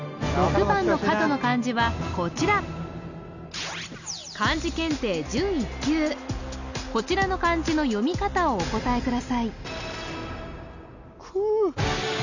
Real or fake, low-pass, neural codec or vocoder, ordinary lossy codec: real; 7.2 kHz; none; none